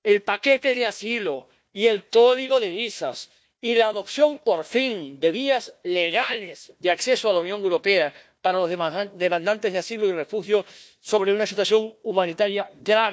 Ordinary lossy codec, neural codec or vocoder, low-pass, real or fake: none; codec, 16 kHz, 1 kbps, FunCodec, trained on Chinese and English, 50 frames a second; none; fake